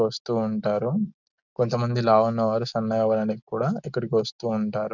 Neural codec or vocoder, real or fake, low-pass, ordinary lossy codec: none; real; 7.2 kHz; none